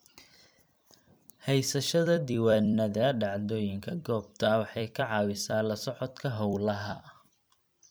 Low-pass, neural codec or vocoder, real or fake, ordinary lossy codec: none; vocoder, 44.1 kHz, 128 mel bands every 256 samples, BigVGAN v2; fake; none